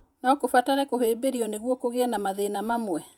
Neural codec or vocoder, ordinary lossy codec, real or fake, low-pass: vocoder, 48 kHz, 128 mel bands, Vocos; none; fake; 19.8 kHz